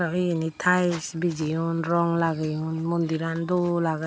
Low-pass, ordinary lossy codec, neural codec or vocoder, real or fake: none; none; none; real